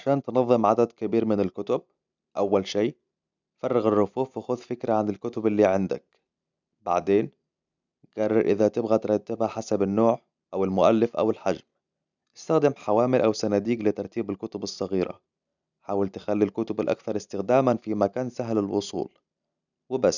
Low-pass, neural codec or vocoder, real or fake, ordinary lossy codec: 7.2 kHz; none; real; none